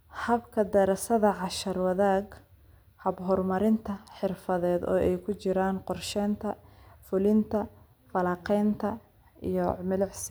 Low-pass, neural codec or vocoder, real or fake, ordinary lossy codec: none; none; real; none